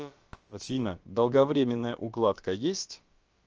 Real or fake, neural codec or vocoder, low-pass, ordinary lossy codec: fake; codec, 16 kHz, about 1 kbps, DyCAST, with the encoder's durations; 7.2 kHz; Opus, 24 kbps